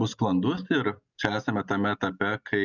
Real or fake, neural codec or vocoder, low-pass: real; none; 7.2 kHz